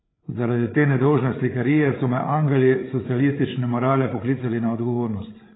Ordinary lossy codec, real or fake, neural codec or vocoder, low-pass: AAC, 16 kbps; fake; codec, 16 kHz, 8 kbps, FreqCodec, larger model; 7.2 kHz